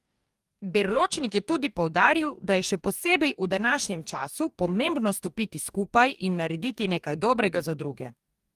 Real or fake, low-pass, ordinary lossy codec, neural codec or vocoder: fake; 14.4 kHz; Opus, 32 kbps; codec, 44.1 kHz, 2.6 kbps, DAC